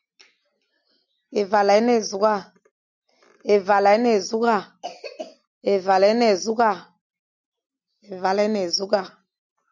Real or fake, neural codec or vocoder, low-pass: real; none; 7.2 kHz